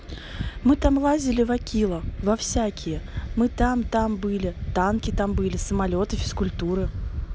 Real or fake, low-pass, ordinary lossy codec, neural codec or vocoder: real; none; none; none